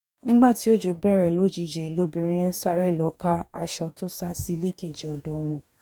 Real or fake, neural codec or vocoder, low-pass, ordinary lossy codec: fake; codec, 44.1 kHz, 2.6 kbps, DAC; 19.8 kHz; none